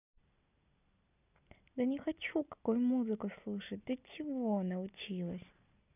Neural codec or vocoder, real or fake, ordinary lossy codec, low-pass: none; real; none; 3.6 kHz